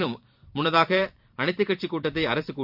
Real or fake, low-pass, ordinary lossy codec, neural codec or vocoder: fake; 5.4 kHz; AAC, 48 kbps; vocoder, 44.1 kHz, 128 mel bands every 256 samples, BigVGAN v2